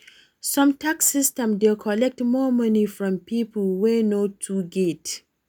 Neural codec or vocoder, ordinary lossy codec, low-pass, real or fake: none; none; none; real